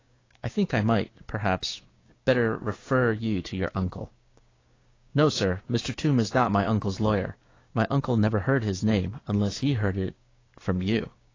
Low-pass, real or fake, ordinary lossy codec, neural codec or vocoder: 7.2 kHz; fake; AAC, 32 kbps; codec, 16 kHz, 6 kbps, DAC